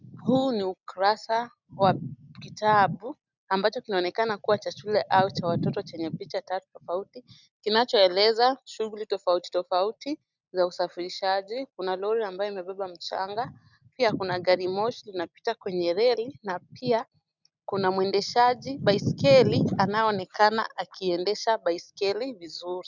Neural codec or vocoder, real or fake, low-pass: none; real; 7.2 kHz